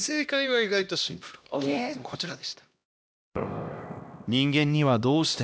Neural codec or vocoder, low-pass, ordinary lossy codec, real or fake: codec, 16 kHz, 1 kbps, X-Codec, HuBERT features, trained on LibriSpeech; none; none; fake